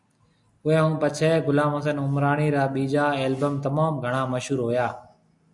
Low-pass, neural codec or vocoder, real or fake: 10.8 kHz; none; real